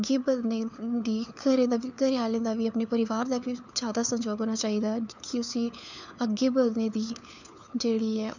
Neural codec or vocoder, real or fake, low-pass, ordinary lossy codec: codec, 16 kHz, 4 kbps, FunCodec, trained on LibriTTS, 50 frames a second; fake; 7.2 kHz; none